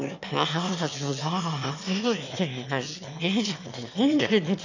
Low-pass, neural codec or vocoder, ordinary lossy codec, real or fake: 7.2 kHz; autoencoder, 22.05 kHz, a latent of 192 numbers a frame, VITS, trained on one speaker; none; fake